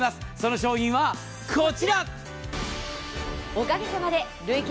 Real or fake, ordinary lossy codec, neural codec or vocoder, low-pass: real; none; none; none